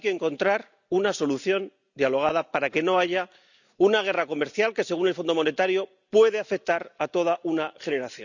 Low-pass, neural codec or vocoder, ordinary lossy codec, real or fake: 7.2 kHz; none; none; real